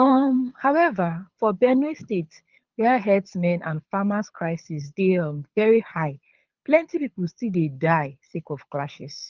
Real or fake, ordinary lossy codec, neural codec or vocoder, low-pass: fake; Opus, 24 kbps; codec, 24 kHz, 6 kbps, HILCodec; 7.2 kHz